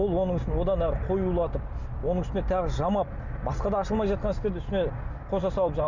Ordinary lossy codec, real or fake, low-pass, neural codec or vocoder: none; real; 7.2 kHz; none